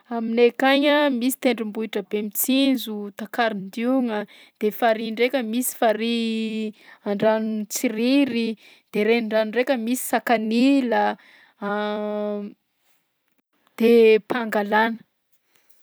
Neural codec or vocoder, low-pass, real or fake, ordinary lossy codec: vocoder, 44.1 kHz, 128 mel bands every 256 samples, BigVGAN v2; none; fake; none